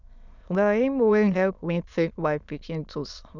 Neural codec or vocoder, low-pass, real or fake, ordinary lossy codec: autoencoder, 22.05 kHz, a latent of 192 numbers a frame, VITS, trained on many speakers; 7.2 kHz; fake; none